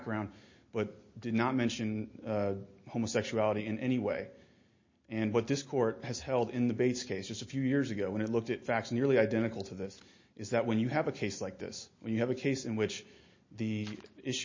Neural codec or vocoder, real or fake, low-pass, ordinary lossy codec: none; real; 7.2 kHz; MP3, 32 kbps